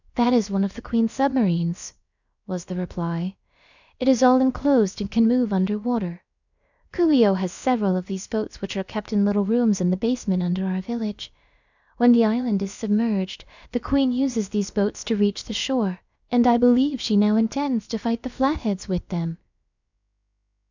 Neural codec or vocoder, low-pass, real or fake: codec, 16 kHz, about 1 kbps, DyCAST, with the encoder's durations; 7.2 kHz; fake